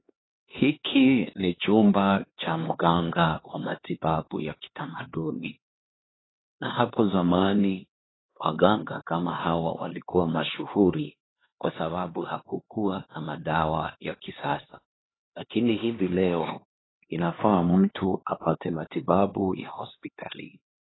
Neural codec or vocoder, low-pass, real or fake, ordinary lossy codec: codec, 16 kHz, 2 kbps, X-Codec, HuBERT features, trained on LibriSpeech; 7.2 kHz; fake; AAC, 16 kbps